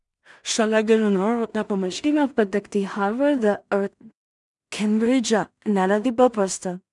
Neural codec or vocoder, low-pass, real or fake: codec, 16 kHz in and 24 kHz out, 0.4 kbps, LongCat-Audio-Codec, two codebook decoder; 10.8 kHz; fake